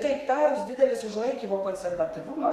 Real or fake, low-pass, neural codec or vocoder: fake; 14.4 kHz; codec, 32 kHz, 1.9 kbps, SNAC